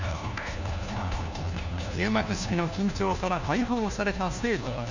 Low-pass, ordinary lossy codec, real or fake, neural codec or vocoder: 7.2 kHz; none; fake; codec, 16 kHz, 1 kbps, FunCodec, trained on LibriTTS, 50 frames a second